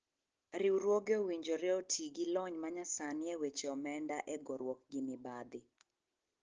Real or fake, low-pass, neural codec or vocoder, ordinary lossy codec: real; 7.2 kHz; none; Opus, 16 kbps